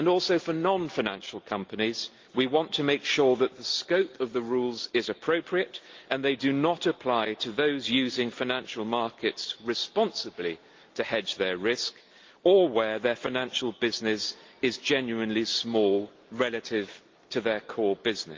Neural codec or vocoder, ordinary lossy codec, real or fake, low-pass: none; Opus, 32 kbps; real; 7.2 kHz